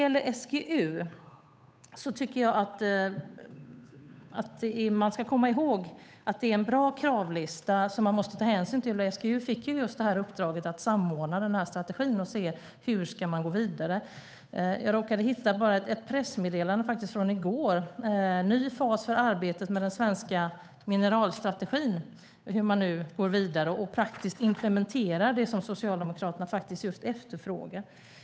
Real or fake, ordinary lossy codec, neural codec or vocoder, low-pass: fake; none; codec, 16 kHz, 8 kbps, FunCodec, trained on Chinese and English, 25 frames a second; none